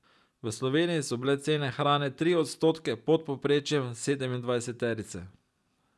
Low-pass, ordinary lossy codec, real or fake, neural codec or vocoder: none; none; real; none